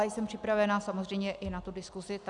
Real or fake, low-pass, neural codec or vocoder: real; 10.8 kHz; none